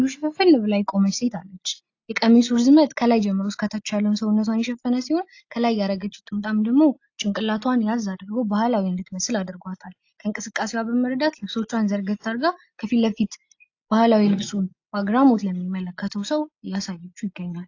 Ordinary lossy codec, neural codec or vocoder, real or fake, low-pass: AAC, 48 kbps; none; real; 7.2 kHz